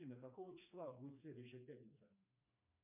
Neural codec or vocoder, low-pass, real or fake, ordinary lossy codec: codec, 16 kHz, 2 kbps, FreqCodec, smaller model; 3.6 kHz; fake; MP3, 32 kbps